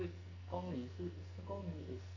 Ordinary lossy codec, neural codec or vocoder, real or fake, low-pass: none; codec, 44.1 kHz, 2.6 kbps, SNAC; fake; 7.2 kHz